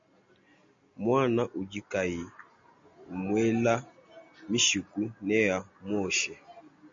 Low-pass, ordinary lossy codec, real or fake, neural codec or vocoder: 7.2 kHz; MP3, 48 kbps; real; none